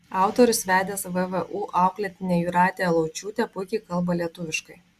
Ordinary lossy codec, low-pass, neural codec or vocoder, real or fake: Opus, 64 kbps; 14.4 kHz; none; real